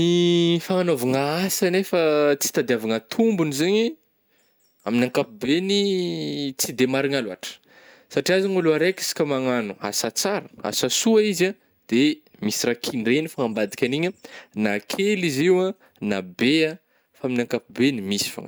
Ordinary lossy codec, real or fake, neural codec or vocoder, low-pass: none; real; none; none